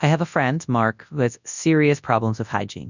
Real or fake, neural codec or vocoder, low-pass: fake; codec, 24 kHz, 0.9 kbps, WavTokenizer, large speech release; 7.2 kHz